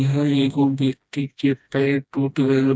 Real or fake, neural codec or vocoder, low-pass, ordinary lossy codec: fake; codec, 16 kHz, 1 kbps, FreqCodec, smaller model; none; none